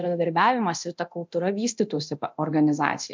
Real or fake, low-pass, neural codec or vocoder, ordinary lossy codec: fake; 7.2 kHz; codec, 24 kHz, 0.9 kbps, DualCodec; MP3, 64 kbps